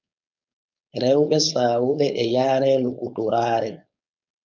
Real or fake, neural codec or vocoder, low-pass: fake; codec, 16 kHz, 4.8 kbps, FACodec; 7.2 kHz